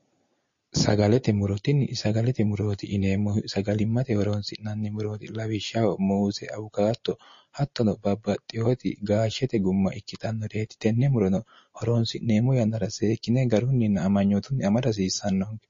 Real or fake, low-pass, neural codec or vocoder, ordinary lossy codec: real; 7.2 kHz; none; MP3, 32 kbps